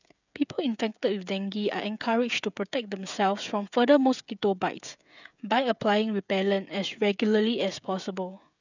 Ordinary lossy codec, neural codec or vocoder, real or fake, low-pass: none; codec, 16 kHz, 16 kbps, FreqCodec, smaller model; fake; 7.2 kHz